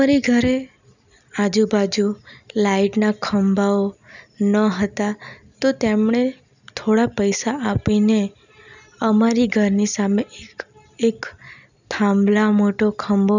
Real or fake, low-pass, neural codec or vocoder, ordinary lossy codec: real; 7.2 kHz; none; none